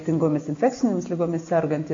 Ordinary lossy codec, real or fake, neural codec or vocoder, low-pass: MP3, 48 kbps; real; none; 7.2 kHz